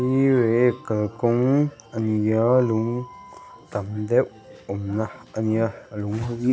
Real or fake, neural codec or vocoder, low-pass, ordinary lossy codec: real; none; none; none